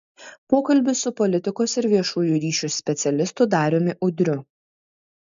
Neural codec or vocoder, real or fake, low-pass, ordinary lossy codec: none; real; 7.2 kHz; MP3, 64 kbps